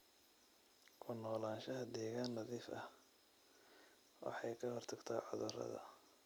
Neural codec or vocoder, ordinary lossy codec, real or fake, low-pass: none; none; real; none